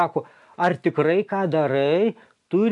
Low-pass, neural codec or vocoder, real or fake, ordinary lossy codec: 10.8 kHz; none; real; MP3, 96 kbps